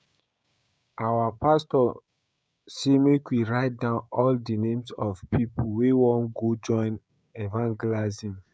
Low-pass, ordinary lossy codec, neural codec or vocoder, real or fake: none; none; codec, 16 kHz, 6 kbps, DAC; fake